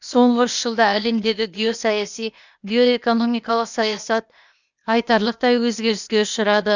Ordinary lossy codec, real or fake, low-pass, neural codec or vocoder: none; fake; 7.2 kHz; codec, 16 kHz, 0.8 kbps, ZipCodec